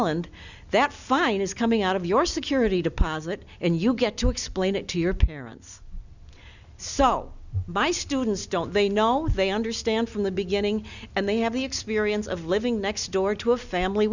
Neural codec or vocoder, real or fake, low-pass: none; real; 7.2 kHz